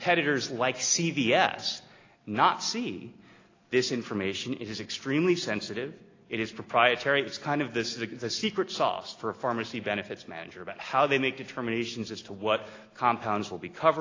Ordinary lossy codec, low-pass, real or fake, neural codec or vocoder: AAC, 32 kbps; 7.2 kHz; real; none